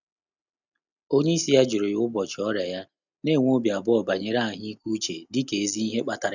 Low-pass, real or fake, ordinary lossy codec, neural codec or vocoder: 7.2 kHz; real; none; none